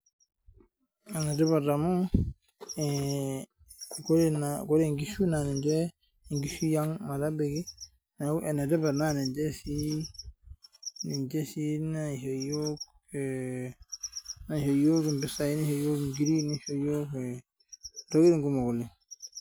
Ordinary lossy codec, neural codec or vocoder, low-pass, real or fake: none; none; none; real